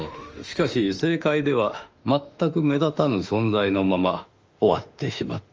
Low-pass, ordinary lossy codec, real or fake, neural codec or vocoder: 7.2 kHz; Opus, 24 kbps; fake; autoencoder, 48 kHz, 32 numbers a frame, DAC-VAE, trained on Japanese speech